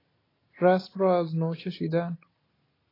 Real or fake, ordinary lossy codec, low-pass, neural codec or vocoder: real; AAC, 24 kbps; 5.4 kHz; none